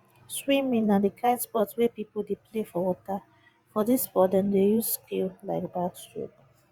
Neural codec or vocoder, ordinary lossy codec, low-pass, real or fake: vocoder, 44.1 kHz, 128 mel bands every 512 samples, BigVGAN v2; Opus, 64 kbps; 19.8 kHz; fake